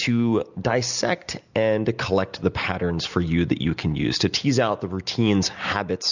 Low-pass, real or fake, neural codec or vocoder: 7.2 kHz; real; none